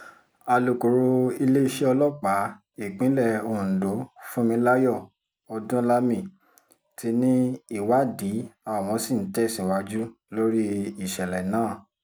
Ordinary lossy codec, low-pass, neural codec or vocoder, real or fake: none; none; none; real